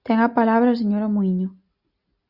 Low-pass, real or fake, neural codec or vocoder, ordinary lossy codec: 5.4 kHz; real; none; Opus, 64 kbps